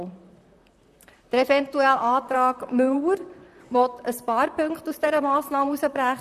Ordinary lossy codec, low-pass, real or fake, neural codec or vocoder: none; 14.4 kHz; fake; vocoder, 44.1 kHz, 128 mel bands, Pupu-Vocoder